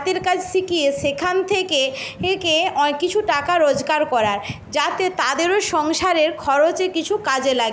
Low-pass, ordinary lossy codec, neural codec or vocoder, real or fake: none; none; none; real